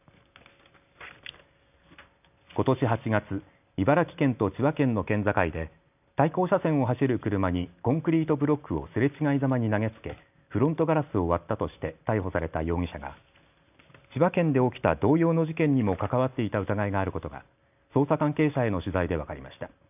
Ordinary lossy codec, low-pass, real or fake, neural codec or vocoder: none; 3.6 kHz; real; none